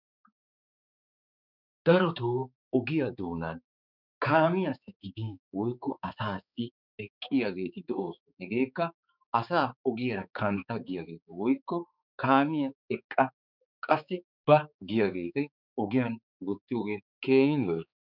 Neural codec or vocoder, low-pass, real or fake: codec, 16 kHz, 4 kbps, X-Codec, HuBERT features, trained on balanced general audio; 5.4 kHz; fake